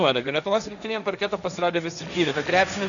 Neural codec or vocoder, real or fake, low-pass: codec, 16 kHz, 1.1 kbps, Voila-Tokenizer; fake; 7.2 kHz